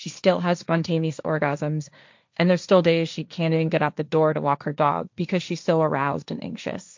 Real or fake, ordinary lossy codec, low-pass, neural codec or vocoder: fake; MP3, 64 kbps; 7.2 kHz; codec, 16 kHz, 1.1 kbps, Voila-Tokenizer